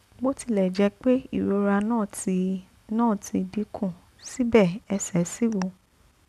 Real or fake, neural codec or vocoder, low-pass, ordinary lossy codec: real; none; 14.4 kHz; none